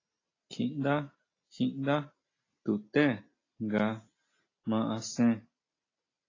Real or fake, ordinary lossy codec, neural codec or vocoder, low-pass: real; AAC, 32 kbps; none; 7.2 kHz